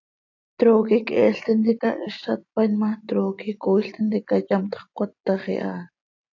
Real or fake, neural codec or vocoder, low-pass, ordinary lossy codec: real; none; 7.2 kHz; AAC, 48 kbps